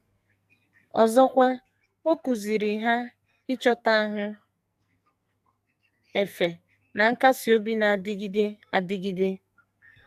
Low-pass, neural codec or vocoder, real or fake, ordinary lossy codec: 14.4 kHz; codec, 44.1 kHz, 2.6 kbps, SNAC; fake; AAC, 96 kbps